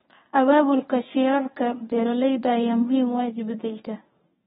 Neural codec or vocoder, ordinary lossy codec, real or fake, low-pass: codec, 24 kHz, 0.9 kbps, WavTokenizer, medium speech release version 1; AAC, 16 kbps; fake; 10.8 kHz